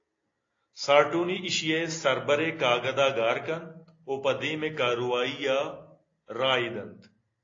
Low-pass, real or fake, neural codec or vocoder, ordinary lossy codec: 7.2 kHz; real; none; AAC, 32 kbps